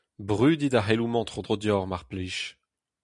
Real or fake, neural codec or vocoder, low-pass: real; none; 10.8 kHz